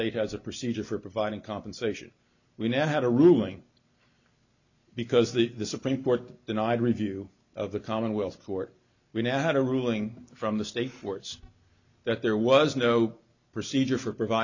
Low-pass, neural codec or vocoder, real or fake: 7.2 kHz; none; real